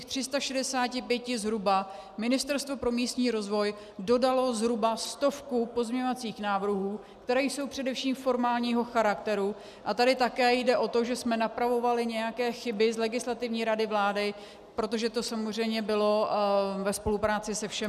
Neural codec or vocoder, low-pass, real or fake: none; 14.4 kHz; real